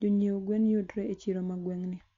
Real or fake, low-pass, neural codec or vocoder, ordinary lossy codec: real; 7.2 kHz; none; Opus, 64 kbps